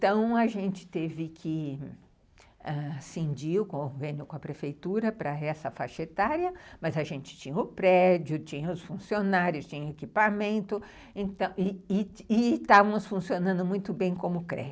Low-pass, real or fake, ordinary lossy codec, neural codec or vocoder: none; real; none; none